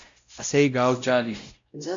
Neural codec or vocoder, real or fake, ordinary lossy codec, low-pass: codec, 16 kHz, 0.5 kbps, X-Codec, WavLM features, trained on Multilingual LibriSpeech; fake; MP3, 48 kbps; 7.2 kHz